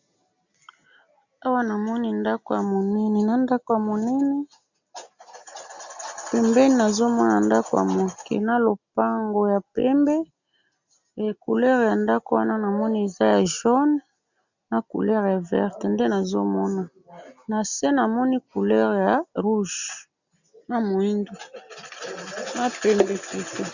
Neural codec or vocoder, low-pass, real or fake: none; 7.2 kHz; real